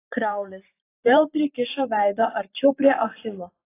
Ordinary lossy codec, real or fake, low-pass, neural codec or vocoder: AAC, 24 kbps; fake; 3.6 kHz; vocoder, 44.1 kHz, 128 mel bands every 512 samples, BigVGAN v2